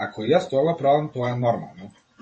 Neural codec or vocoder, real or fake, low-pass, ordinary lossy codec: vocoder, 44.1 kHz, 128 mel bands every 512 samples, BigVGAN v2; fake; 10.8 kHz; MP3, 32 kbps